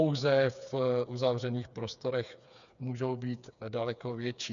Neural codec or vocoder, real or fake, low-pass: codec, 16 kHz, 4 kbps, FreqCodec, smaller model; fake; 7.2 kHz